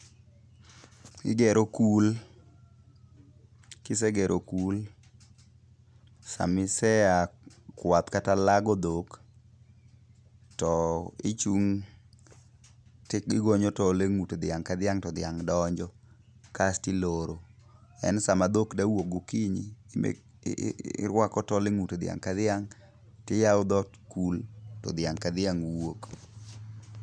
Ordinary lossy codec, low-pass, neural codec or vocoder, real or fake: none; none; none; real